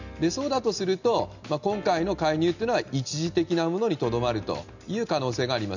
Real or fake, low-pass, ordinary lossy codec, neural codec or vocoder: real; 7.2 kHz; none; none